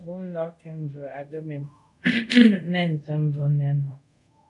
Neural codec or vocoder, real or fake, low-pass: codec, 24 kHz, 0.5 kbps, DualCodec; fake; 10.8 kHz